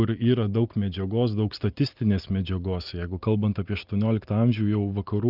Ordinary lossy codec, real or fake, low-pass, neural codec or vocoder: Opus, 32 kbps; real; 5.4 kHz; none